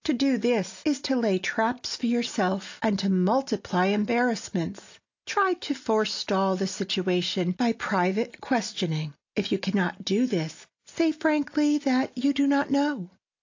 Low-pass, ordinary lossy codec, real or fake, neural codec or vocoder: 7.2 kHz; AAC, 48 kbps; real; none